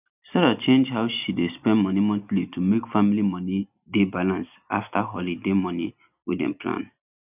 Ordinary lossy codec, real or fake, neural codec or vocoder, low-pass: none; real; none; 3.6 kHz